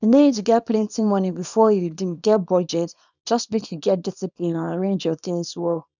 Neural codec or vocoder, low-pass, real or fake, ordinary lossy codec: codec, 24 kHz, 0.9 kbps, WavTokenizer, small release; 7.2 kHz; fake; none